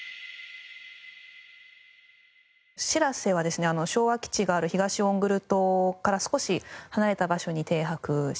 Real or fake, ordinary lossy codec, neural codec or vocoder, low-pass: real; none; none; none